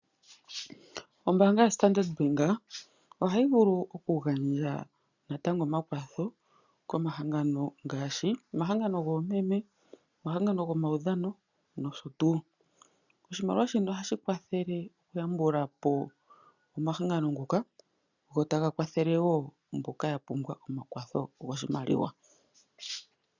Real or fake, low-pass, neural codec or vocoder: real; 7.2 kHz; none